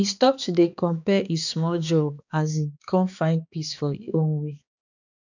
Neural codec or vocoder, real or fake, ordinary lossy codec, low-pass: codec, 16 kHz, 2 kbps, X-Codec, HuBERT features, trained on balanced general audio; fake; none; 7.2 kHz